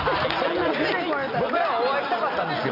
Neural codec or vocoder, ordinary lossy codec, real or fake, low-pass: none; none; real; 5.4 kHz